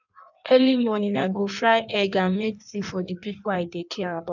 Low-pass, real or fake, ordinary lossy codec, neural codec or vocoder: 7.2 kHz; fake; none; codec, 16 kHz in and 24 kHz out, 1.1 kbps, FireRedTTS-2 codec